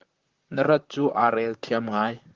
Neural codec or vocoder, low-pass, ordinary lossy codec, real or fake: vocoder, 44.1 kHz, 128 mel bands, Pupu-Vocoder; 7.2 kHz; Opus, 16 kbps; fake